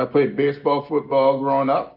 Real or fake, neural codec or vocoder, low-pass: real; none; 5.4 kHz